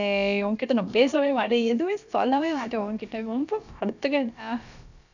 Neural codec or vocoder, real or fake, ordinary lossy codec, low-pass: codec, 16 kHz, about 1 kbps, DyCAST, with the encoder's durations; fake; none; 7.2 kHz